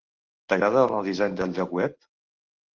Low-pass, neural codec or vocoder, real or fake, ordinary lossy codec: 7.2 kHz; none; real; Opus, 16 kbps